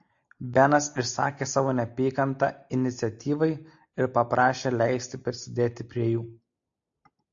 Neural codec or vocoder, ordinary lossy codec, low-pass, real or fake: none; AAC, 64 kbps; 7.2 kHz; real